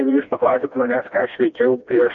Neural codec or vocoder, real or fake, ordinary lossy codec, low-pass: codec, 16 kHz, 1 kbps, FreqCodec, smaller model; fake; MP3, 48 kbps; 7.2 kHz